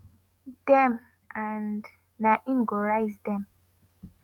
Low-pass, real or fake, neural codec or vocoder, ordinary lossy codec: 19.8 kHz; fake; codec, 44.1 kHz, 7.8 kbps, DAC; none